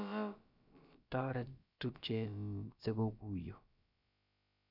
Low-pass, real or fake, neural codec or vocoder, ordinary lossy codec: 5.4 kHz; fake; codec, 16 kHz, about 1 kbps, DyCAST, with the encoder's durations; none